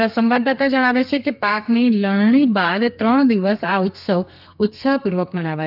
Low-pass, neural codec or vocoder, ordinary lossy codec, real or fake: 5.4 kHz; codec, 32 kHz, 1.9 kbps, SNAC; none; fake